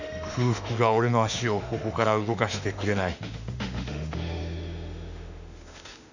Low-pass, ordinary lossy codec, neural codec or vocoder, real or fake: 7.2 kHz; none; autoencoder, 48 kHz, 32 numbers a frame, DAC-VAE, trained on Japanese speech; fake